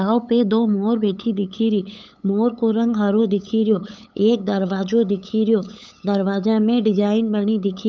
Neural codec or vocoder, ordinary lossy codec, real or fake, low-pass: codec, 16 kHz, 8 kbps, FunCodec, trained on LibriTTS, 25 frames a second; none; fake; none